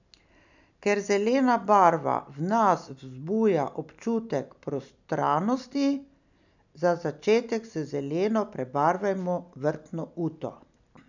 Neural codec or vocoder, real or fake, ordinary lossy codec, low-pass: none; real; none; 7.2 kHz